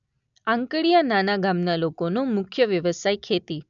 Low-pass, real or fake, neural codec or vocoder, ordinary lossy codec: 7.2 kHz; real; none; none